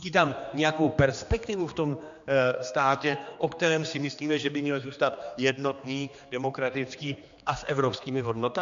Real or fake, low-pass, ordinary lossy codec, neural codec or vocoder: fake; 7.2 kHz; MP3, 64 kbps; codec, 16 kHz, 2 kbps, X-Codec, HuBERT features, trained on general audio